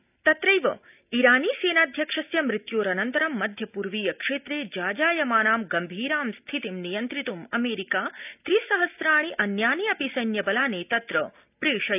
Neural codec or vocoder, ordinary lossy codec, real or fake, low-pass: none; none; real; 3.6 kHz